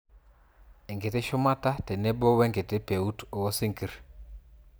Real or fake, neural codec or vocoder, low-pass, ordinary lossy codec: real; none; none; none